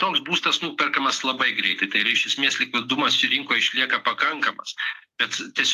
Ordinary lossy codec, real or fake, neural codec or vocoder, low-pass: AAC, 64 kbps; real; none; 14.4 kHz